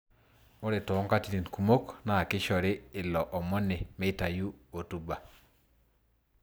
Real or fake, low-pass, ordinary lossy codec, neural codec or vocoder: real; none; none; none